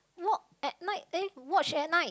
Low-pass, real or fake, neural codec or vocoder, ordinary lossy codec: none; real; none; none